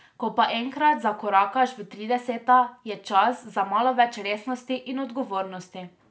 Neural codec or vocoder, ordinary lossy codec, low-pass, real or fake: none; none; none; real